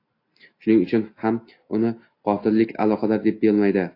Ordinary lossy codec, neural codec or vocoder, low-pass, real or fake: AAC, 32 kbps; none; 5.4 kHz; real